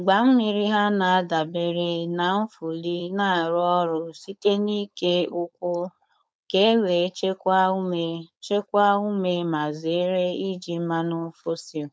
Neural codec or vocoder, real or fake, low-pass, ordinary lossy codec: codec, 16 kHz, 4.8 kbps, FACodec; fake; none; none